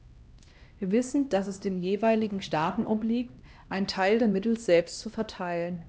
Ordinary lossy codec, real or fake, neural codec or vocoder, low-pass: none; fake; codec, 16 kHz, 1 kbps, X-Codec, HuBERT features, trained on LibriSpeech; none